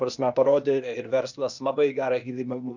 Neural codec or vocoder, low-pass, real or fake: codec, 16 kHz, 0.8 kbps, ZipCodec; 7.2 kHz; fake